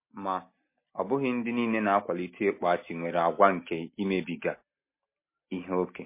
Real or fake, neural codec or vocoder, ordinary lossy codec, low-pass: real; none; MP3, 24 kbps; 3.6 kHz